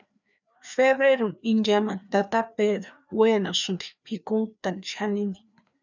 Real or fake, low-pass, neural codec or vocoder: fake; 7.2 kHz; codec, 16 kHz, 2 kbps, FreqCodec, larger model